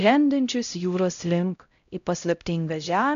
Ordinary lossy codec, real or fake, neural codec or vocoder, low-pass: AAC, 64 kbps; fake; codec, 16 kHz, 0.5 kbps, X-Codec, HuBERT features, trained on LibriSpeech; 7.2 kHz